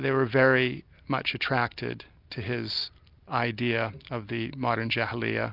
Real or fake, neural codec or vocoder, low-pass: real; none; 5.4 kHz